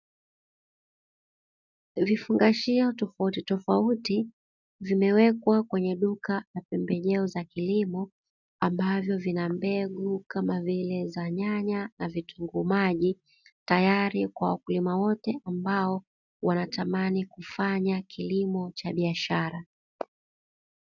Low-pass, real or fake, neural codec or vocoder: 7.2 kHz; real; none